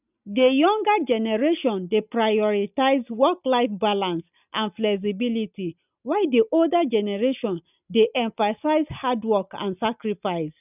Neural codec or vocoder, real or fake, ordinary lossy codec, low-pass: none; real; none; 3.6 kHz